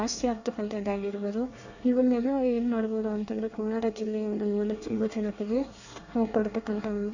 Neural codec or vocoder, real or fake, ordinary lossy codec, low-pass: codec, 24 kHz, 1 kbps, SNAC; fake; none; 7.2 kHz